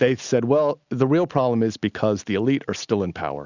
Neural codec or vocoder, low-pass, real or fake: none; 7.2 kHz; real